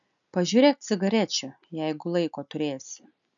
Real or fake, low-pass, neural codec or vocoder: real; 7.2 kHz; none